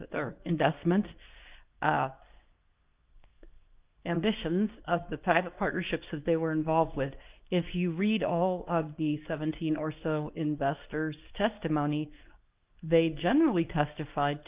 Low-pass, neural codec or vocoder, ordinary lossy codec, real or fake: 3.6 kHz; codec, 24 kHz, 0.9 kbps, WavTokenizer, medium speech release version 2; Opus, 32 kbps; fake